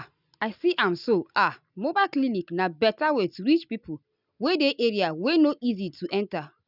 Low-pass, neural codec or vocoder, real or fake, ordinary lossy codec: 5.4 kHz; none; real; none